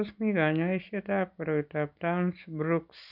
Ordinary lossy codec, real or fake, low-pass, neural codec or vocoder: none; real; 5.4 kHz; none